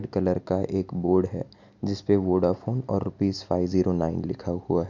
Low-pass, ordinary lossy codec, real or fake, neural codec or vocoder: 7.2 kHz; none; real; none